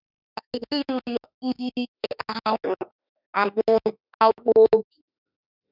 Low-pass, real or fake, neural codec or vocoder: 5.4 kHz; fake; autoencoder, 48 kHz, 32 numbers a frame, DAC-VAE, trained on Japanese speech